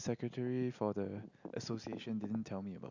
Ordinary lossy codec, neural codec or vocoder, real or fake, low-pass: none; none; real; 7.2 kHz